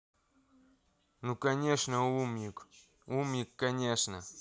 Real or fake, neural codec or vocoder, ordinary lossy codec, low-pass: real; none; none; none